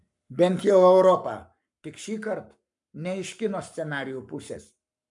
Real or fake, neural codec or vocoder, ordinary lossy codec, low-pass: fake; codec, 44.1 kHz, 7.8 kbps, Pupu-Codec; MP3, 96 kbps; 10.8 kHz